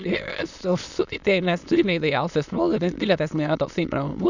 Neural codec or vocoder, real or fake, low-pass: autoencoder, 22.05 kHz, a latent of 192 numbers a frame, VITS, trained on many speakers; fake; 7.2 kHz